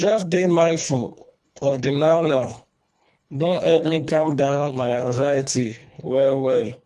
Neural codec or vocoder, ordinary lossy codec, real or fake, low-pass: codec, 24 kHz, 1.5 kbps, HILCodec; none; fake; none